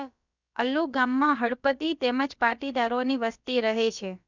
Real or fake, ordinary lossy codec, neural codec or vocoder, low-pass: fake; none; codec, 16 kHz, about 1 kbps, DyCAST, with the encoder's durations; 7.2 kHz